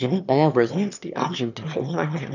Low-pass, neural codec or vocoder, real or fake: 7.2 kHz; autoencoder, 22.05 kHz, a latent of 192 numbers a frame, VITS, trained on one speaker; fake